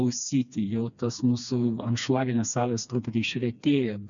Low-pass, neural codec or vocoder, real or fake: 7.2 kHz; codec, 16 kHz, 2 kbps, FreqCodec, smaller model; fake